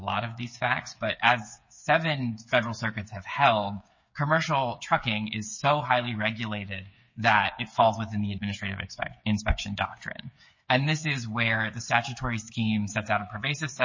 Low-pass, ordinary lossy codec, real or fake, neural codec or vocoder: 7.2 kHz; MP3, 32 kbps; fake; codec, 16 kHz, 8 kbps, FreqCodec, smaller model